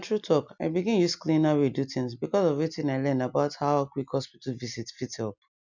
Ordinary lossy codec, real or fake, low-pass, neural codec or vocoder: none; real; 7.2 kHz; none